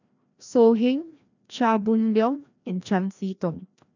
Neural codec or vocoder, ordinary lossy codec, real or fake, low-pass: codec, 16 kHz, 1 kbps, FreqCodec, larger model; none; fake; 7.2 kHz